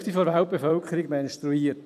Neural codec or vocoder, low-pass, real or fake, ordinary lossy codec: vocoder, 44.1 kHz, 128 mel bands every 256 samples, BigVGAN v2; 14.4 kHz; fake; AAC, 96 kbps